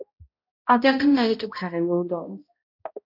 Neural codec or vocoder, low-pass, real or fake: codec, 16 kHz, 0.5 kbps, X-Codec, HuBERT features, trained on balanced general audio; 5.4 kHz; fake